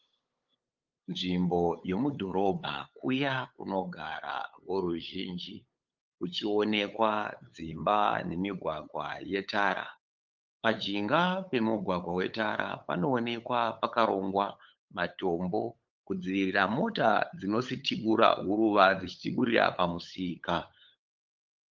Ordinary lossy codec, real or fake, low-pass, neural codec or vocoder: Opus, 24 kbps; fake; 7.2 kHz; codec, 16 kHz, 8 kbps, FunCodec, trained on LibriTTS, 25 frames a second